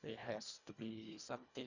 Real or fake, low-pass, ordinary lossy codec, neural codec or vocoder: fake; 7.2 kHz; none; codec, 24 kHz, 1.5 kbps, HILCodec